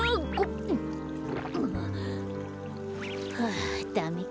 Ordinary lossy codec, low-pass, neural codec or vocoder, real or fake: none; none; none; real